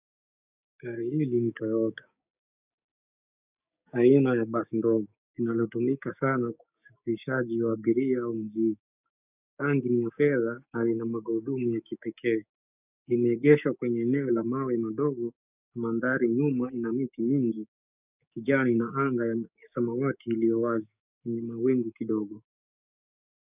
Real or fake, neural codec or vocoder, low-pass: fake; codec, 16 kHz, 6 kbps, DAC; 3.6 kHz